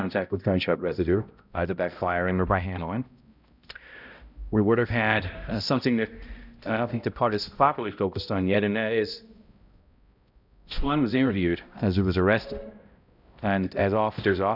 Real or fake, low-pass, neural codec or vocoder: fake; 5.4 kHz; codec, 16 kHz, 0.5 kbps, X-Codec, HuBERT features, trained on balanced general audio